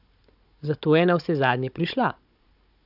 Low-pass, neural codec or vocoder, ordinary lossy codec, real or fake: 5.4 kHz; codec, 16 kHz, 16 kbps, FunCodec, trained on Chinese and English, 50 frames a second; AAC, 48 kbps; fake